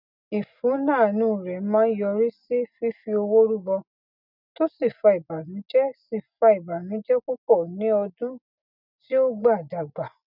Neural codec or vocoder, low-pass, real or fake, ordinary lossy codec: none; 5.4 kHz; real; none